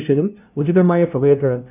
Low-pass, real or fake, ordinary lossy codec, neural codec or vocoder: 3.6 kHz; fake; none; codec, 16 kHz, 0.5 kbps, FunCodec, trained on LibriTTS, 25 frames a second